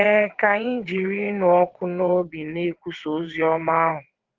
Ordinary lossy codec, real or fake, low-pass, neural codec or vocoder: Opus, 16 kbps; fake; 7.2 kHz; vocoder, 22.05 kHz, 80 mel bands, WaveNeXt